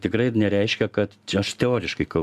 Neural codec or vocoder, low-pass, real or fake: none; 14.4 kHz; real